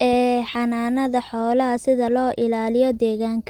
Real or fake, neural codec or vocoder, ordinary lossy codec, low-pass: real; none; Opus, 24 kbps; 19.8 kHz